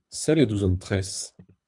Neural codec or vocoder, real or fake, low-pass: codec, 24 kHz, 3 kbps, HILCodec; fake; 10.8 kHz